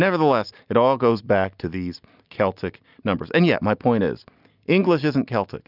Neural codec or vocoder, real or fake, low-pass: none; real; 5.4 kHz